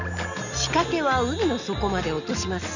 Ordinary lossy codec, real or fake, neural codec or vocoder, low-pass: none; real; none; 7.2 kHz